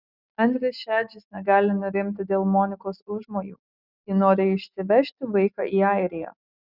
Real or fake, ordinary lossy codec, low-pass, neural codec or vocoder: real; Opus, 64 kbps; 5.4 kHz; none